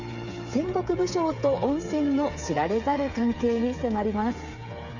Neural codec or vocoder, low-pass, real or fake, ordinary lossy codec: codec, 16 kHz, 8 kbps, FreqCodec, smaller model; 7.2 kHz; fake; none